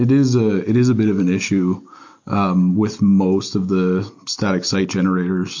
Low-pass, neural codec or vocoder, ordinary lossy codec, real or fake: 7.2 kHz; none; MP3, 48 kbps; real